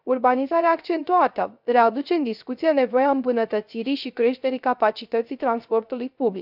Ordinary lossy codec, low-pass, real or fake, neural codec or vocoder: none; 5.4 kHz; fake; codec, 16 kHz, 0.3 kbps, FocalCodec